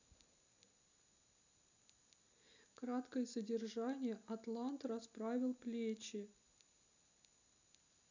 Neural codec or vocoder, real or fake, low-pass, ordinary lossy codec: none; real; 7.2 kHz; none